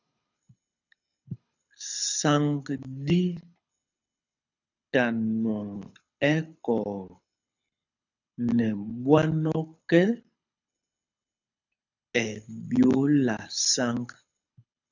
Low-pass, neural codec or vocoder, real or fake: 7.2 kHz; codec, 24 kHz, 6 kbps, HILCodec; fake